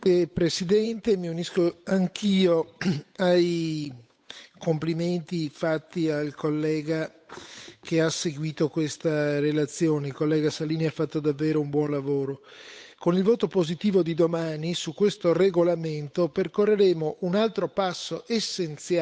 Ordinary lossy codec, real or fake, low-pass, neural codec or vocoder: none; fake; none; codec, 16 kHz, 8 kbps, FunCodec, trained on Chinese and English, 25 frames a second